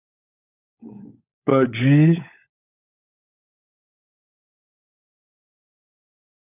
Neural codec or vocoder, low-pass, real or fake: codec, 16 kHz, 16 kbps, FunCodec, trained on LibriTTS, 50 frames a second; 3.6 kHz; fake